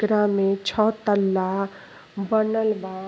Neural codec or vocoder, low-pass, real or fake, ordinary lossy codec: none; none; real; none